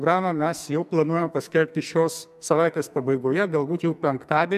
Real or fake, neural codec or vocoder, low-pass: fake; codec, 44.1 kHz, 2.6 kbps, SNAC; 14.4 kHz